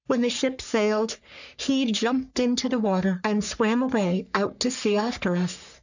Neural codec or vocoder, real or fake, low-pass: codec, 44.1 kHz, 3.4 kbps, Pupu-Codec; fake; 7.2 kHz